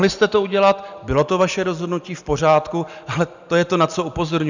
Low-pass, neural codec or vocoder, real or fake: 7.2 kHz; none; real